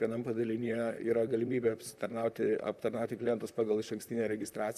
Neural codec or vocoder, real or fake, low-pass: vocoder, 44.1 kHz, 128 mel bands, Pupu-Vocoder; fake; 14.4 kHz